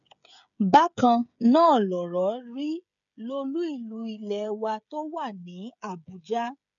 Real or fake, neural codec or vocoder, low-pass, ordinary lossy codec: fake; codec, 16 kHz, 16 kbps, FreqCodec, smaller model; 7.2 kHz; none